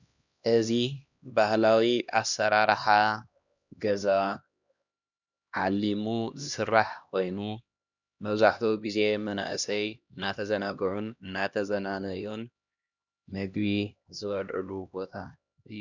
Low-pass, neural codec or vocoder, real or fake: 7.2 kHz; codec, 16 kHz, 1 kbps, X-Codec, HuBERT features, trained on LibriSpeech; fake